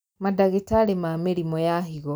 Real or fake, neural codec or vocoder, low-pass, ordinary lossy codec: real; none; none; none